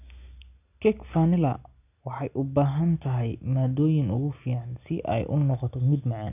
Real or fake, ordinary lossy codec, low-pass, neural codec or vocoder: real; none; 3.6 kHz; none